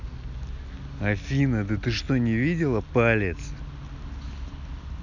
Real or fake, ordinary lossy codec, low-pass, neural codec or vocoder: real; none; 7.2 kHz; none